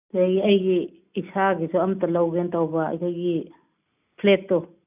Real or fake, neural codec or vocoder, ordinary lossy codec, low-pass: real; none; none; 3.6 kHz